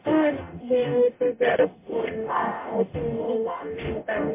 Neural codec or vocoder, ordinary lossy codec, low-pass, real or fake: codec, 44.1 kHz, 0.9 kbps, DAC; MP3, 24 kbps; 3.6 kHz; fake